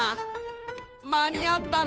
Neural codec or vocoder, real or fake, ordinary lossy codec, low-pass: codec, 16 kHz, 2 kbps, FunCodec, trained on Chinese and English, 25 frames a second; fake; none; none